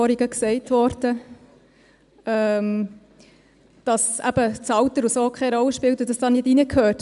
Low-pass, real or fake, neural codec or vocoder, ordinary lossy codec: 10.8 kHz; real; none; none